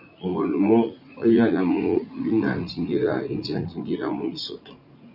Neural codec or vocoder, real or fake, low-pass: vocoder, 44.1 kHz, 80 mel bands, Vocos; fake; 5.4 kHz